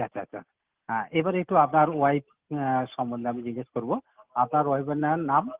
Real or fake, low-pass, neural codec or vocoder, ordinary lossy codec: real; 3.6 kHz; none; Opus, 16 kbps